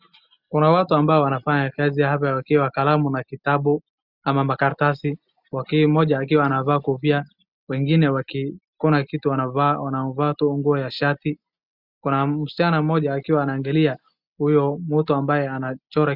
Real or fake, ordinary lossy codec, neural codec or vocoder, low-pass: real; Opus, 64 kbps; none; 5.4 kHz